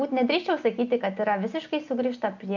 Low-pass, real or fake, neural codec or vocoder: 7.2 kHz; real; none